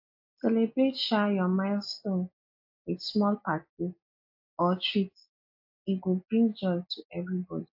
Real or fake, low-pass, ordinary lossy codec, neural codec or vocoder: real; 5.4 kHz; AAC, 48 kbps; none